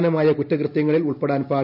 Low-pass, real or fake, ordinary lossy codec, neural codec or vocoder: 5.4 kHz; real; none; none